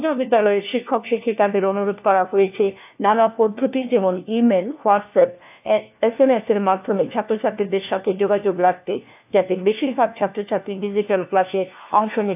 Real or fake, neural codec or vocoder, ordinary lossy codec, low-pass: fake; codec, 16 kHz, 1 kbps, FunCodec, trained on LibriTTS, 50 frames a second; AAC, 32 kbps; 3.6 kHz